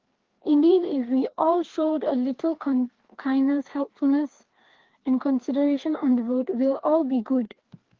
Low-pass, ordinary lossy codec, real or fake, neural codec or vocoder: 7.2 kHz; Opus, 16 kbps; fake; codec, 16 kHz, 2 kbps, FreqCodec, larger model